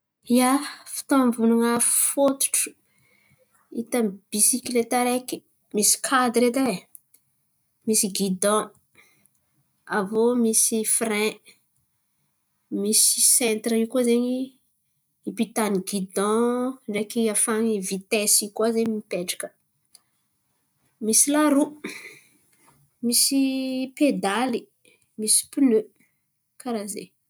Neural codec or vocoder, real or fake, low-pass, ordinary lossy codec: none; real; none; none